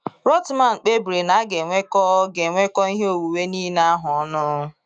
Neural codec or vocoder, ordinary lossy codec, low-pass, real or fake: autoencoder, 48 kHz, 128 numbers a frame, DAC-VAE, trained on Japanese speech; none; 9.9 kHz; fake